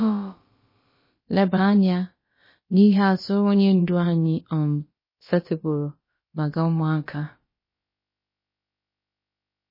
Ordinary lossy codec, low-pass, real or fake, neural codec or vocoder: MP3, 24 kbps; 5.4 kHz; fake; codec, 16 kHz, about 1 kbps, DyCAST, with the encoder's durations